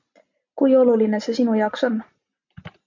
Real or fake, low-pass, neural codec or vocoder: real; 7.2 kHz; none